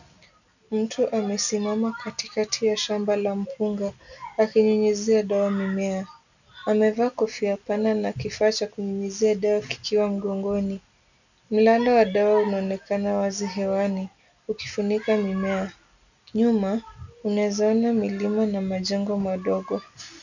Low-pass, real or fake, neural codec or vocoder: 7.2 kHz; real; none